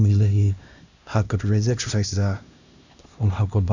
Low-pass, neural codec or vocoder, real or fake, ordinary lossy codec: 7.2 kHz; codec, 16 kHz, 1 kbps, X-Codec, HuBERT features, trained on LibriSpeech; fake; none